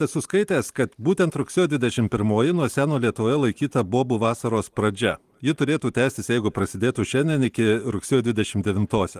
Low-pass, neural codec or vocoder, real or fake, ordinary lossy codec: 14.4 kHz; vocoder, 44.1 kHz, 128 mel bands every 512 samples, BigVGAN v2; fake; Opus, 24 kbps